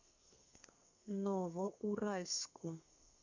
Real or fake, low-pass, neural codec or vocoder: fake; 7.2 kHz; codec, 32 kHz, 1.9 kbps, SNAC